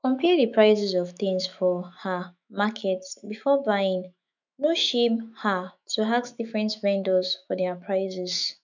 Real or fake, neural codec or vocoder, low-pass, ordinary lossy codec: fake; autoencoder, 48 kHz, 128 numbers a frame, DAC-VAE, trained on Japanese speech; 7.2 kHz; none